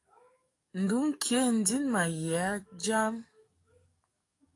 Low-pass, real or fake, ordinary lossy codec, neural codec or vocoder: 10.8 kHz; fake; AAC, 48 kbps; codec, 44.1 kHz, 7.8 kbps, DAC